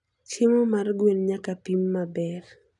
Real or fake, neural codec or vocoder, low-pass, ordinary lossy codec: real; none; 10.8 kHz; none